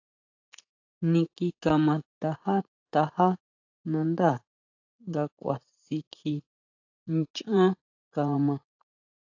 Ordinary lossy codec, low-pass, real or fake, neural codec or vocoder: AAC, 48 kbps; 7.2 kHz; fake; vocoder, 44.1 kHz, 80 mel bands, Vocos